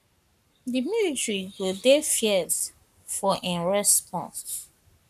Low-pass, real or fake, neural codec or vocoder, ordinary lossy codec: 14.4 kHz; fake; codec, 44.1 kHz, 7.8 kbps, Pupu-Codec; none